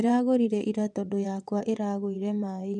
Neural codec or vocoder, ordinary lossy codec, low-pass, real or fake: vocoder, 22.05 kHz, 80 mel bands, WaveNeXt; none; 9.9 kHz; fake